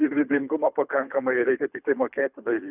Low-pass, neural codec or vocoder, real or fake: 3.6 kHz; codec, 24 kHz, 3 kbps, HILCodec; fake